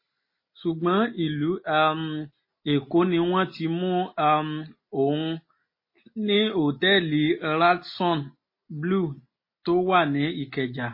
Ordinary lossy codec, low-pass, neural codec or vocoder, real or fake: MP3, 24 kbps; 5.4 kHz; none; real